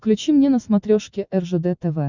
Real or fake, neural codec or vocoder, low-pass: real; none; 7.2 kHz